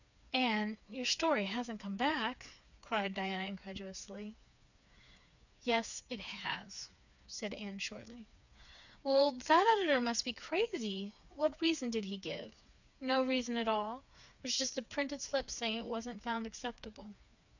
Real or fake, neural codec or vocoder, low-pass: fake; codec, 16 kHz, 4 kbps, FreqCodec, smaller model; 7.2 kHz